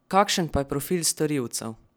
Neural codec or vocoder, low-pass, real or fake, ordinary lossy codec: none; none; real; none